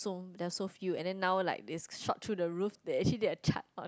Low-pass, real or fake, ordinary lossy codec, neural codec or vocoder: none; real; none; none